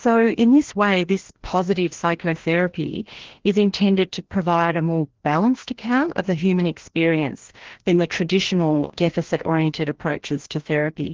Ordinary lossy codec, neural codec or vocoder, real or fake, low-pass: Opus, 16 kbps; codec, 16 kHz, 1 kbps, FreqCodec, larger model; fake; 7.2 kHz